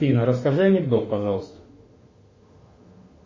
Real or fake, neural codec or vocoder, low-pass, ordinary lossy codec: fake; autoencoder, 48 kHz, 32 numbers a frame, DAC-VAE, trained on Japanese speech; 7.2 kHz; MP3, 32 kbps